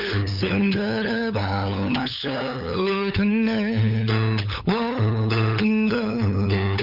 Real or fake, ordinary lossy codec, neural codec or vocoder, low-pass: fake; none; codec, 16 kHz, 8 kbps, FunCodec, trained on LibriTTS, 25 frames a second; 5.4 kHz